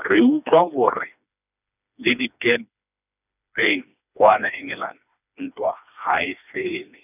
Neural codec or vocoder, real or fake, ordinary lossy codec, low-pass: codec, 16 kHz, 2 kbps, FreqCodec, smaller model; fake; none; 3.6 kHz